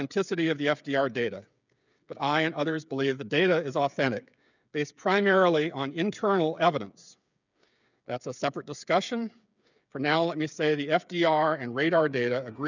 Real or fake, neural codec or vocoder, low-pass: fake; codec, 16 kHz, 8 kbps, FreqCodec, smaller model; 7.2 kHz